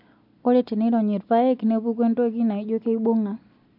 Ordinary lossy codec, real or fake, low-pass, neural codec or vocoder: MP3, 48 kbps; real; 5.4 kHz; none